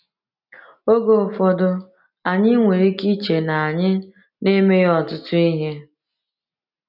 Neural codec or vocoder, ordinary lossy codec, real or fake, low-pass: none; none; real; 5.4 kHz